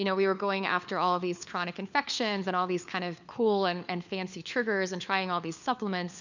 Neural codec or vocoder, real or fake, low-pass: codec, 16 kHz, 4 kbps, FunCodec, trained on LibriTTS, 50 frames a second; fake; 7.2 kHz